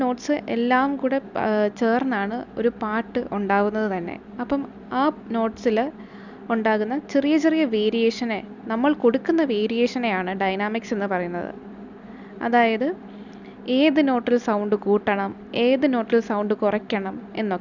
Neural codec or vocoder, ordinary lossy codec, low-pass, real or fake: none; none; 7.2 kHz; real